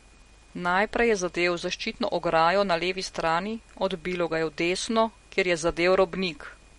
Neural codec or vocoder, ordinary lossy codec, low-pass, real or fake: none; MP3, 48 kbps; 19.8 kHz; real